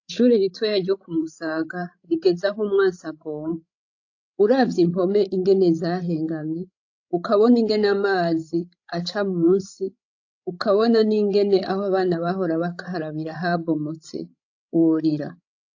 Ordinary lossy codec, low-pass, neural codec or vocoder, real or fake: AAC, 48 kbps; 7.2 kHz; codec, 16 kHz, 16 kbps, FreqCodec, larger model; fake